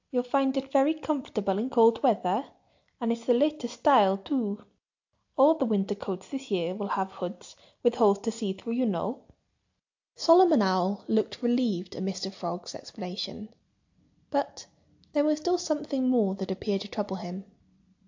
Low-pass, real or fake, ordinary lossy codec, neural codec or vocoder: 7.2 kHz; real; AAC, 48 kbps; none